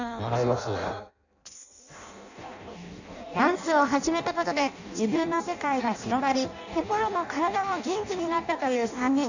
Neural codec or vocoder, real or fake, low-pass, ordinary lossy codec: codec, 16 kHz in and 24 kHz out, 0.6 kbps, FireRedTTS-2 codec; fake; 7.2 kHz; none